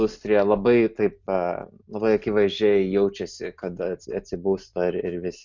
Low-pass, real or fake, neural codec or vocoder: 7.2 kHz; real; none